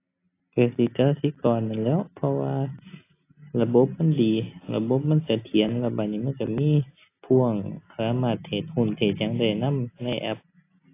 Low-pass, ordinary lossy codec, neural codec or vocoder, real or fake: 3.6 kHz; AAC, 24 kbps; none; real